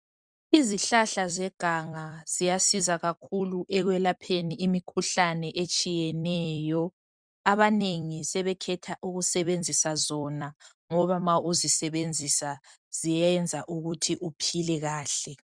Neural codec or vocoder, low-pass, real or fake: vocoder, 44.1 kHz, 128 mel bands every 256 samples, BigVGAN v2; 9.9 kHz; fake